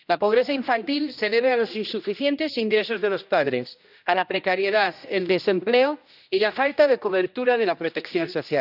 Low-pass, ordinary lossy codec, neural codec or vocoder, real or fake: 5.4 kHz; none; codec, 16 kHz, 1 kbps, X-Codec, HuBERT features, trained on general audio; fake